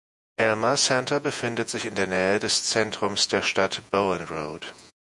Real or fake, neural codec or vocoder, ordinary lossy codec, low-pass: fake; vocoder, 48 kHz, 128 mel bands, Vocos; MP3, 96 kbps; 10.8 kHz